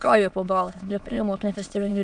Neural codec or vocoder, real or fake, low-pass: autoencoder, 22.05 kHz, a latent of 192 numbers a frame, VITS, trained on many speakers; fake; 9.9 kHz